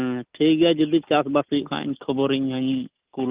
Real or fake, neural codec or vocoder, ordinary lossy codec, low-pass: real; none; Opus, 32 kbps; 3.6 kHz